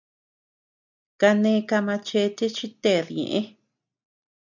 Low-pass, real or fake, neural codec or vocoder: 7.2 kHz; real; none